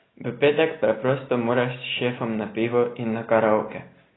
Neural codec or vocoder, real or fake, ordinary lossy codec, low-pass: none; real; AAC, 16 kbps; 7.2 kHz